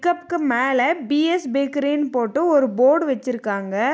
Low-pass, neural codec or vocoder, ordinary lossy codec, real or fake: none; none; none; real